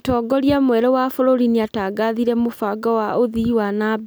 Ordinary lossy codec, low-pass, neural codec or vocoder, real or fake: none; none; none; real